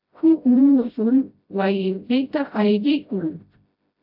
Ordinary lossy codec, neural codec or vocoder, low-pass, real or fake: AAC, 48 kbps; codec, 16 kHz, 0.5 kbps, FreqCodec, smaller model; 5.4 kHz; fake